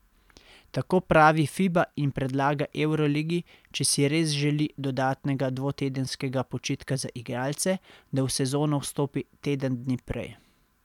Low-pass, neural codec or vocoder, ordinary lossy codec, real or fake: 19.8 kHz; none; none; real